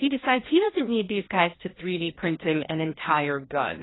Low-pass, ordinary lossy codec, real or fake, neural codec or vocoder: 7.2 kHz; AAC, 16 kbps; fake; codec, 16 kHz, 1 kbps, FreqCodec, larger model